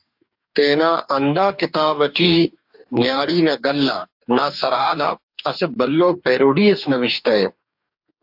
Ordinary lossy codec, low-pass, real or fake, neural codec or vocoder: AAC, 48 kbps; 5.4 kHz; fake; codec, 44.1 kHz, 2.6 kbps, DAC